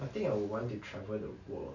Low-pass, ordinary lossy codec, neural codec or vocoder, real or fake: 7.2 kHz; none; none; real